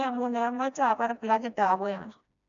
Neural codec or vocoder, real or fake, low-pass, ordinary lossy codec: codec, 16 kHz, 1 kbps, FreqCodec, smaller model; fake; 7.2 kHz; none